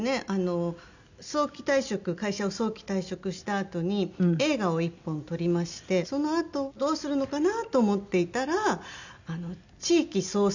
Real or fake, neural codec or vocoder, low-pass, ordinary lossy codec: real; none; 7.2 kHz; none